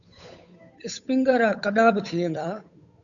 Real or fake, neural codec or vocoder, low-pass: fake; codec, 16 kHz, 8 kbps, FunCodec, trained on Chinese and English, 25 frames a second; 7.2 kHz